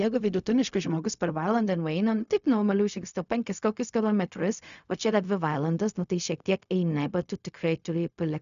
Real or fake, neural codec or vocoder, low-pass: fake; codec, 16 kHz, 0.4 kbps, LongCat-Audio-Codec; 7.2 kHz